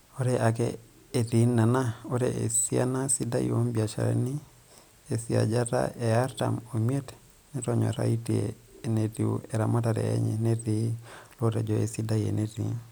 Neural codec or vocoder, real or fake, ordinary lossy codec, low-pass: none; real; none; none